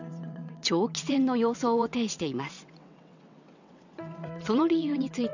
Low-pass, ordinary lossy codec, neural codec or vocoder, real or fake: 7.2 kHz; none; vocoder, 22.05 kHz, 80 mel bands, WaveNeXt; fake